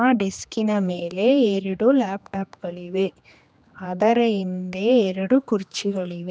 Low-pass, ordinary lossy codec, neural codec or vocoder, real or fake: none; none; codec, 16 kHz, 2 kbps, X-Codec, HuBERT features, trained on general audio; fake